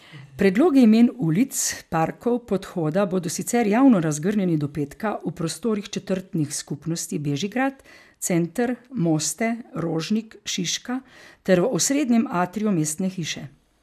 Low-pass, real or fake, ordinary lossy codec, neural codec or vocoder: 14.4 kHz; real; none; none